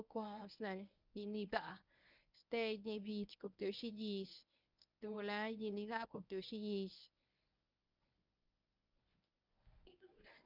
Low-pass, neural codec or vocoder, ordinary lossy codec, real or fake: 5.4 kHz; codec, 24 kHz, 0.9 kbps, WavTokenizer, medium speech release version 1; none; fake